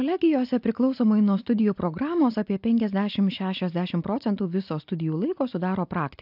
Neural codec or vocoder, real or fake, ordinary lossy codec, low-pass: none; real; AAC, 48 kbps; 5.4 kHz